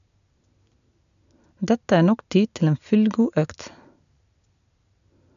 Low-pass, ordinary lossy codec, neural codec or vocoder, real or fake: 7.2 kHz; none; none; real